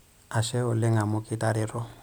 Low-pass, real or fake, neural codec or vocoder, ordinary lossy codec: none; real; none; none